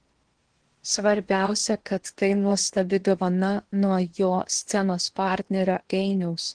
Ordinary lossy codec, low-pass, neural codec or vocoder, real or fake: Opus, 16 kbps; 9.9 kHz; codec, 16 kHz in and 24 kHz out, 0.8 kbps, FocalCodec, streaming, 65536 codes; fake